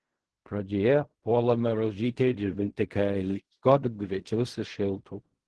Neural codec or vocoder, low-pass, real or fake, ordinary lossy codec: codec, 16 kHz in and 24 kHz out, 0.4 kbps, LongCat-Audio-Codec, fine tuned four codebook decoder; 10.8 kHz; fake; Opus, 16 kbps